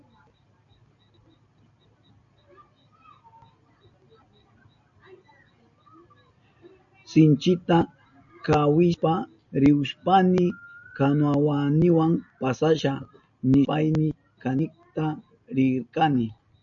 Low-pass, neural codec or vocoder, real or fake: 7.2 kHz; none; real